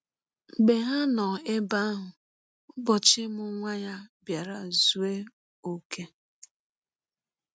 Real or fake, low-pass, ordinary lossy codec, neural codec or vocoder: real; none; none; none